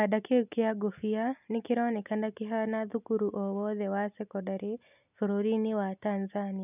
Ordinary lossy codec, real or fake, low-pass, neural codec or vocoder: none; real; 3.6 kHz; none